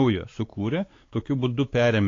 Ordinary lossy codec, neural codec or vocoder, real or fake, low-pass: AAC, 32 kbps; codec, 16 kHz, 4 kbps, X-Codec, WavLM features, trained on Multilingual LibriSpeech; fake; 7.2 kHz